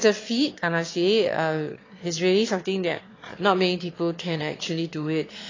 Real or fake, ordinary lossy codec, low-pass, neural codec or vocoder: fake; AAC, 32 kbps; 7.2 kHz; autoencoder, 22.05 kHz, a latent of 192 numbers a frame, VITS, trained on one speaker